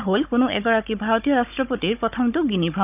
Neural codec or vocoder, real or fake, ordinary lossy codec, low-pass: codec, 16 kHz, 16 kbps, FunCodec, trained on Chinese and English, 50 frames a second; fake; none; 3.6 kHz